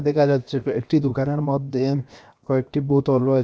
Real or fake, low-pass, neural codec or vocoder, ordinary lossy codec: fake; none; codec, 16 kHz, 0.7 kbps, FocalCodec; none